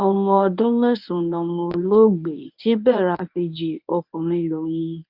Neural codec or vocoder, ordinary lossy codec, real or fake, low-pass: codec, 24 kHz, 0.9 kbps, WavTokenizer, medium speech release version 1; none; fake; 5.4 kHz